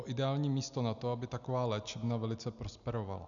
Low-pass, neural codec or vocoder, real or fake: 7.2 kHz; none; real